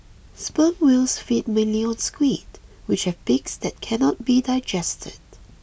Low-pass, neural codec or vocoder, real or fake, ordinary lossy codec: none; none; real; none